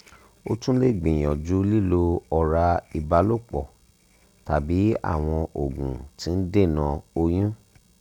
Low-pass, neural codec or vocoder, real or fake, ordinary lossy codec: 19.8 kHz; none; real; none